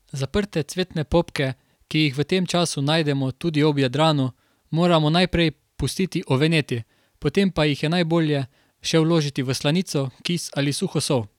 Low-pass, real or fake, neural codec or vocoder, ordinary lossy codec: 19.8 kHz; real; none; none